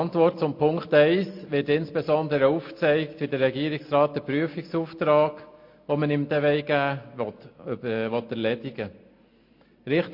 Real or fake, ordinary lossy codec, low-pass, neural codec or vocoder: real; MP3, 32 kbps; 5.4 kHz; none